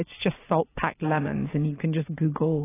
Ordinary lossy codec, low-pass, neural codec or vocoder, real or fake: AAC, 16 kbps; 3.6 kHz; none; real